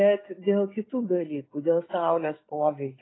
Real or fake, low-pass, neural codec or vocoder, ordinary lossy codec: real; 7.2 kHz; none; AAC, 16 kbps